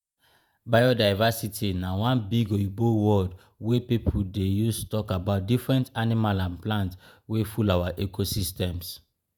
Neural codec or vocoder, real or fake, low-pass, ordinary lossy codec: vocoder, 48 kHz, 128 mel bands, Vocos; fake; none; none